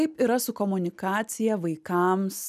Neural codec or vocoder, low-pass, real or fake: none; 14.4 kHz; real